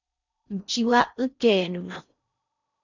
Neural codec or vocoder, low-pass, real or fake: codec, 16 kHz in and 24 kHz out, 0.6 kbps, FocalCodec, streaming, 4096 codes; 7.2 kHz; fake